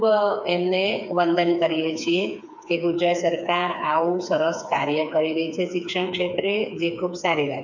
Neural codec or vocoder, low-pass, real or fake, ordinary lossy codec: codec, 16 kHz, 4 kbps, FreqCodec, smaller model; 7.2 kHz; fake; none